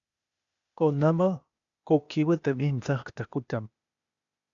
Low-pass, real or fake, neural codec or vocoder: 7.2 kHz; fake; codec, 16 kHz, 0.8 kbps, ZipCodec